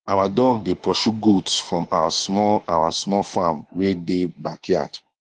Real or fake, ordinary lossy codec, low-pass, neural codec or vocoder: fake; Opus, 24 kbps; 9.9 kHz; autoencoder, 48 kHz, 32 numbers a frame, DAC-VAE, trained on Japanese speech